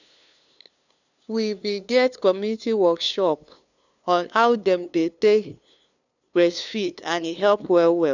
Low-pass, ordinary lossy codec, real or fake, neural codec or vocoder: 7.2 kHz; none; fake; codec, 16 kHz, 2 kbps, FunCodec, trained on LibriTTS, 25 frames a second